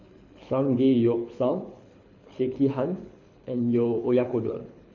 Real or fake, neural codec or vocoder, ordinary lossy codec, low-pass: fake; codec, 24 kHz, 6 kbps, HILCodec; none; 7.2 kHz